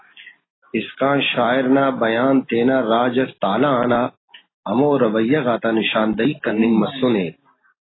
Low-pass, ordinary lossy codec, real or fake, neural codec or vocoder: 7.2 kHz; AAC, 16 kbps; real; none